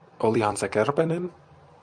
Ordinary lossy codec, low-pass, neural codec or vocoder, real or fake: MP3, 96 kbps; 9.9 kHz; vocoder, 44.1 kHz, 128 mel bands every 256 samples, BigVGAN v2; fake